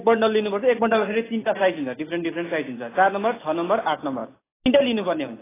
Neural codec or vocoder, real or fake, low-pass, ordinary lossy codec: none; real; 3.6 kHz; AAC, 16 kbps